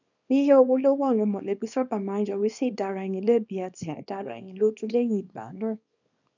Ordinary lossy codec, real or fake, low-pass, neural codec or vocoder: none; fake; 7.2 kHz; codec, 24 kHz, 0.9 kbps, WavTokenizer, small release